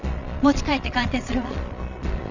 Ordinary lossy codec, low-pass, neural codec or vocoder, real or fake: none; 7.2 kHz; vocoder, 22.05 kHz, 80 mel bands, Vocos; fake